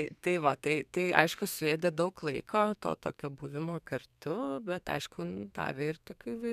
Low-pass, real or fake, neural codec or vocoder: 14.4 kHz; fake; codec, 32 kHz, 1.9 kbps, SNAC